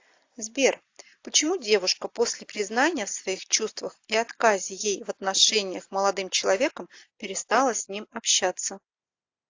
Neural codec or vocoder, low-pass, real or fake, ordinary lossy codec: none; 7.2 kHz; real; AAC, 48 kbps